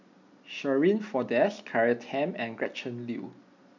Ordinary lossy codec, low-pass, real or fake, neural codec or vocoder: none; 7.2 kHz; real; none